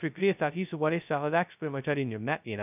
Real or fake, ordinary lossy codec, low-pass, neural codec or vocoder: fake; none; 3.6 kHz; codec, 16 kHz, 0.2 kbps, FocalCodec